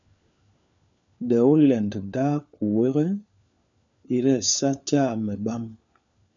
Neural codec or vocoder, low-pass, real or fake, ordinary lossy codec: codec, 16 kHz, 4 kbps, FunCodec, trained on LibriTTS, 50 frames a second; 7.2 kHz; fake; MP3, 96 kbps